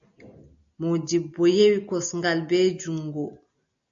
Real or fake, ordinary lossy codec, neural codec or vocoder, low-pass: real; AAC, 64 kbps; none; 7.2 kHz